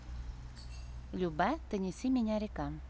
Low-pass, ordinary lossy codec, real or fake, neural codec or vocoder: none; none; real; none